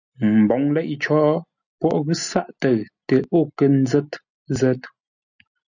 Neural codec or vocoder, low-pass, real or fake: none; 7.2 kHz; real